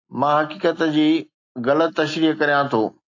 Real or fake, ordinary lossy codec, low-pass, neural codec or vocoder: real; AAC, 48 kbps; 7.2 kHz; none